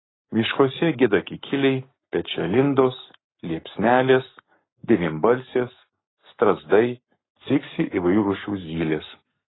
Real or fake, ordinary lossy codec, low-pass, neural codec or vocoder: fake; AAC, 16 kbps; 7.2 kHz; codec, 44.1 kHz, 7.8 kbps, Pupu-Codec